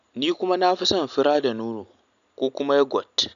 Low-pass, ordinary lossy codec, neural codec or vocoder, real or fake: 7.2 kHz; none; none; real